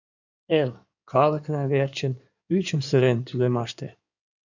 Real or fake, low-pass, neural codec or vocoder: fake; 7.2 kHz; codec, 16 kHz in and 24 kHz out, 2.2 kbps, FireRedTTS-2 codec